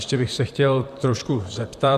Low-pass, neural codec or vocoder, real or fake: 14.4 kHz; vocoder, 44.1 kHz, 128 mel bands, Pupu-Vocoder; fake